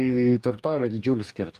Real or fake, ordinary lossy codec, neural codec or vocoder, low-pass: fake; Opus, 32 kbps; codec, 44.1 kHz, 2.6 kbps, DAC; 14.4 kHz